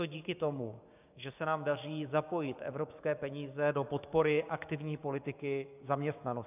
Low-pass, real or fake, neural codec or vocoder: 3.6 kHz; fake; codec, 16 kHz, 6 kbps, DAC